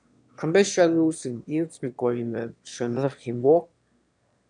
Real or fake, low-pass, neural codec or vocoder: fake; 9.9 kHz; autoencoder, 22.05 kHz, a latent of 192 numbers a frame, VITS, trained on one speaker